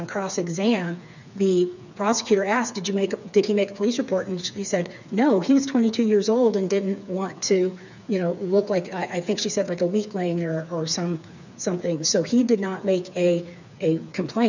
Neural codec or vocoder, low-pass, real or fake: codec, 16 kHz, 4 kbps, FreqCodec, smaller model; 7.2 kHz; fake